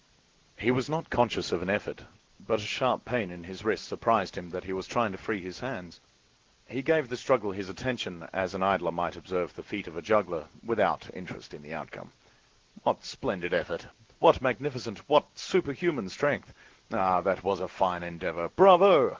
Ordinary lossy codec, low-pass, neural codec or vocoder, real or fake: Opus, 16 kbps; 7.2 kHz; none; real